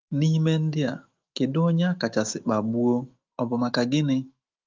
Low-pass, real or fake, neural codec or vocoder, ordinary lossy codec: 7.2 kHz; fake; autoencoder, 48 kHz, 128 numbers a frame, DAC-VAE, trained on Japanese speech; Opus, 24 kbps